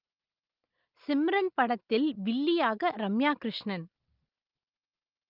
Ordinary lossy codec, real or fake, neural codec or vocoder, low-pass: Opus, 32 kbps; real; none; 5.4 kHz